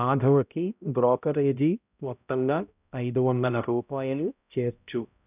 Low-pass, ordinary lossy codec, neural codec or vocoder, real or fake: 3.6 kHz; none; codec, 16 kHz, 0.5 kbps, X-Codec, HuBERT features, trained on balanced general audio; fake